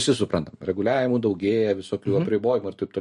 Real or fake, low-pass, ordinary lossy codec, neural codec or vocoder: real; 14.4 kHz; MP3, 48 kbps; none